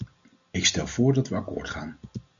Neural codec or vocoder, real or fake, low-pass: none; real; 7.2 kHz